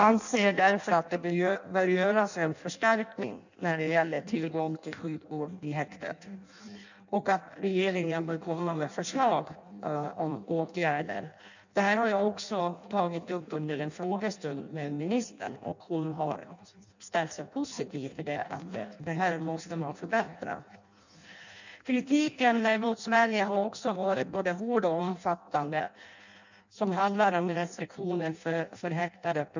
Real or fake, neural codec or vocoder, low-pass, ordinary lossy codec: fake; codec, 16 kHz in and 24 kHz out, 0.6 kbps, FireRedTTS-2 codec; 7.2 kHz; none